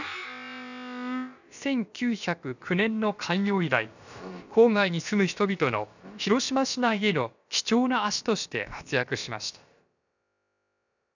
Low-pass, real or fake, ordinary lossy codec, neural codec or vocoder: 7.2 kHz; fake; none; codec, 16 kHz, about 1 kbps, DyCAST, with the encoder's durations